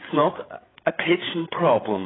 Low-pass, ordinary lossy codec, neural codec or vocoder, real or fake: 7.2 kHz; AAC, 16 kbps; codec, 16 kHz, 8 kbps, FreqCodec, larger model; fake